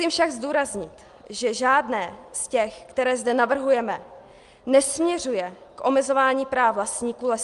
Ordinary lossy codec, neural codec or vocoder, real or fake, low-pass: Opus, 32 kbps; none; real; 10.8 kHz